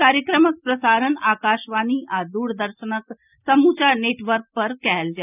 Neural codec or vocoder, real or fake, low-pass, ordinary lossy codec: none; real; 3.6 kHz; none